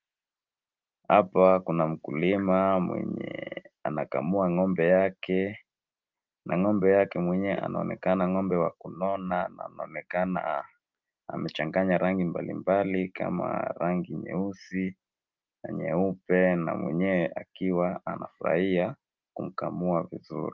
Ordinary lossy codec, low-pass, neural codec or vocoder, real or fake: Opus, 32 kbps; 7.2 kHz; none; real